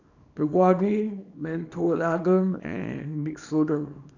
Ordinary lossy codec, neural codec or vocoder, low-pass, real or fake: none; codec, 24 kHz, 0.9 kbps, WavTokenizer, small release; 7.2 kHz; fake